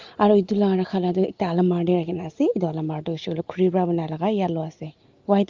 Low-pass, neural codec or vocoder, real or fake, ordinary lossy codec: 7.2 kHz; none; real; Opus, 32 kbps